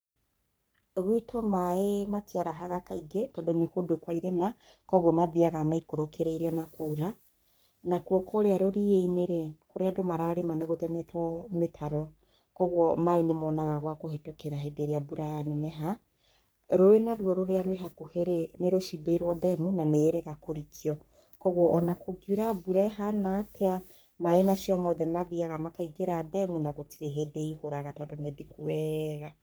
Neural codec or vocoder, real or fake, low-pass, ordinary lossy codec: codec, 44.1 kHz, 3.4 kbps, Pupu-Codec; fake; none; none